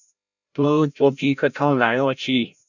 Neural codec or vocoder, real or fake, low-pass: codec, 16 kHz, 0.5 kbps, FreqCodec, larger model; fake; 7.2 kHz